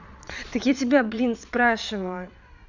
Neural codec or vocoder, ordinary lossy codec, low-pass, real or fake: vocoder, 22.05 kHz, 80 mel bands, Vocos; none; 7.2 kHz; fake